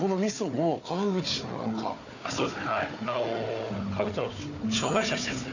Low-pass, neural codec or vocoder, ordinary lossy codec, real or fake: 7.2 kHz; codec, 16 kHz, 4 kbps, FunCodec, trained on Chinese and English, 50 frames a second; none; fake